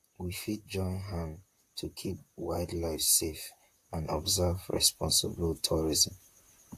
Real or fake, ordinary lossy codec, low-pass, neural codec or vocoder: fake; AAC, 64 kbps; 14.4 kHz; vocoder, 44.1 kHz, 128 mel bands, Pupu-Vocoder